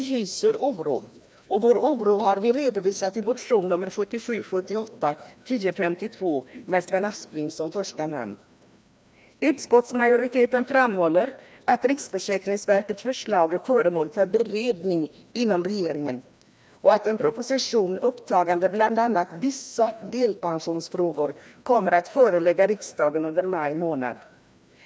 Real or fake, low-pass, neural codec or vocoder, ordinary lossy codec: fake; none; codec, 16 kHz, 1 kbps, FreqCodec, larger model; none